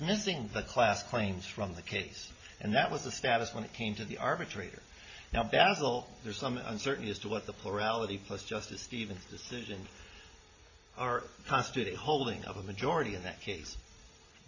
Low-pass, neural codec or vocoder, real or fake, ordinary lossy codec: 7.2 kHz; none; real; MP3, 32 kbps